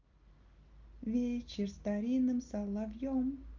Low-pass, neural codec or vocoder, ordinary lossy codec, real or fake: 7.2 kHz; none; Opus, 24 kbps; real